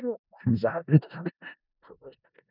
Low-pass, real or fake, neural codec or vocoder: 5.4 kHz; fake; codec, 16 kHz in and 24 kHz out, 0.4 kbps, LongCat-Audio-Codec, four codebook decoder